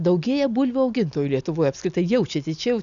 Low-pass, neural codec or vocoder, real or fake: 7.2 kHz; none; real